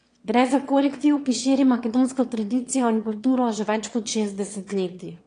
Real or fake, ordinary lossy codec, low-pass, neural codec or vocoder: fake; AAC, 48 kbps; 9.9 kHz; autoencoder, 22.05 kHz, a latent of 192 numbers a frame, VITS, trained on one speaker